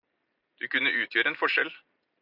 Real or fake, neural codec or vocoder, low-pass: real; none; 5.4 kHz